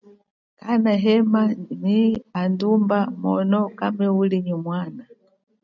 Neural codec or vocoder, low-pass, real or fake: none; 7.2 kHz; real